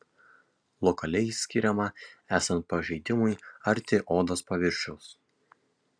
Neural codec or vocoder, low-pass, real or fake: none; 9.9 kHz; real